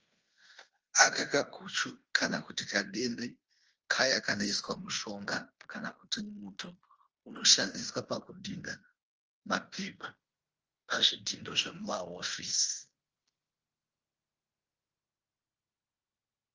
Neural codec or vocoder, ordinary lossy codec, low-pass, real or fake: codec, 16 kHz in and 24 kHz out, 0.9 kbps, LongCat-Audio-Codec, fine tuned four codebook decoder; Opus, 24 kbps; 7.2 kHz; fake